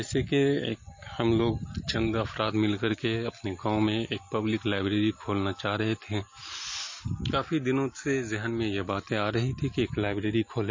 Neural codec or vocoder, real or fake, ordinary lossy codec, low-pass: none; real; MP3, 32 kbps; 7.2 kHz